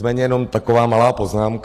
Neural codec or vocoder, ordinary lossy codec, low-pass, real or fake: none; AAC, 48 kbps; 14.4 kHz; real